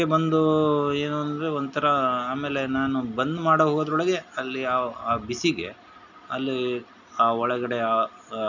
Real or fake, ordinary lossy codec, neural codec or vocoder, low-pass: real; none; none; 7.2 kHz